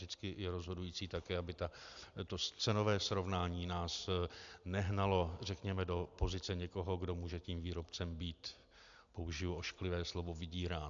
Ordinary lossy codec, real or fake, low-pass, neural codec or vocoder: AAC, 64 kbps; real; 7.2 kHz; none